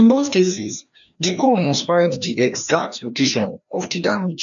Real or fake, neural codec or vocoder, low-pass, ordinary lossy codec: fake; codec, 16 kHz, 1 kbps, FreqCodec, larger model; 7.2 kHz; none